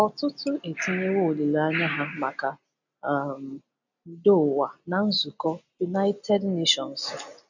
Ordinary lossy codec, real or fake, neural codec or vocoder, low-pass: none; real; none; 7.2 kHz